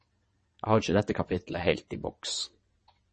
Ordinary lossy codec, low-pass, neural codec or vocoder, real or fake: MP3, 32 kbps; 9.9 kHz; vocoder, 22.05 kHz, 80 mel bands, WaveNeXt; fake